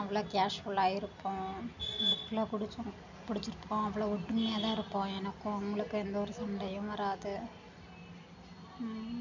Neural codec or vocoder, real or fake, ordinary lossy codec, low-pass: none; real; none; 7.2 kHz